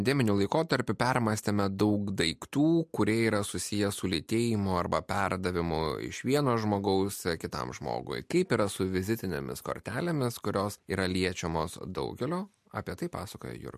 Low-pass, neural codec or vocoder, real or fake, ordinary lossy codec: 14.4 kHz; none; real; MP3, 64 kbps